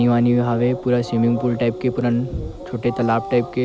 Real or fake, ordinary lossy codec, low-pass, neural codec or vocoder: real; none; none; none